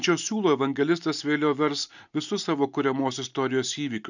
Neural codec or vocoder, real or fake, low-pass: none; real; 7.2 kHz